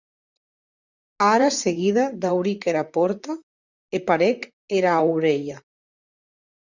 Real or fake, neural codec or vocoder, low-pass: fake; vocoder, 44.1 kHz, 128 mel bands, Pupu-Vocoder; 7.2 kHz